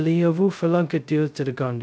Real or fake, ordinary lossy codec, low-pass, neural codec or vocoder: fake; none; none; codec, 16 kHz, 0.2 kbps, FocalCodec